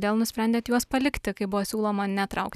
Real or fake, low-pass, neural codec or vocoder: real; 14.4 kHz; none